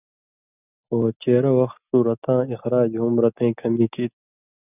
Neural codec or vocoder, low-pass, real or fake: none; 3.6 kHz; real